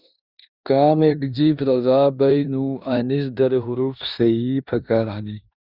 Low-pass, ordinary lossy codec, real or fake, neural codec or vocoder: 5.4 kHz; Opus, 64 kbps; fake; codec, 16 kHz in and 24 kHz out, 0.9 kbps, LongCat-Audio-Codec, four codebook decoder